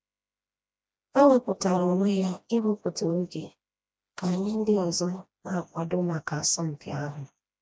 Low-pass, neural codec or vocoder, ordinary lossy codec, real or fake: none; codec, 16 kHz, 1 kbps, FreqCodec, smaller model; none; fake